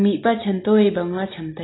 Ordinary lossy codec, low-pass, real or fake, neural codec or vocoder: AAC, 16 kbps; 7.2 kHz; real; none